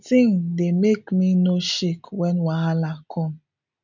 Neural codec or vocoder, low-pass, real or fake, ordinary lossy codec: none; 7.2 kHz; real; none